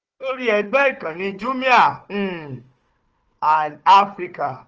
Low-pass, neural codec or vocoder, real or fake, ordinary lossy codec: 7.2 kHz; codec, 16 kHz, 4 kbps, FunCodec, trained on Chinese and English, 50 frames a second; fake; Opus, 24 kbps